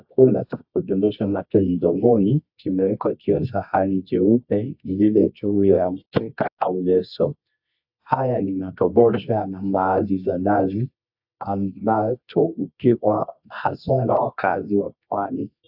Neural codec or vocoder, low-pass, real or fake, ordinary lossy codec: codec, 24 kHz, 0.9 kbps, WavTokenizer, medium music audio release; 5.4 kHz; fake; AAC, 48 kbps